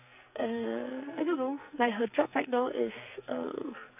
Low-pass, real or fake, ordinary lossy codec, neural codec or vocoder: 3.6 kHz; fake; none; codec, 44.1 kHz, 2.6 kbps, SNAC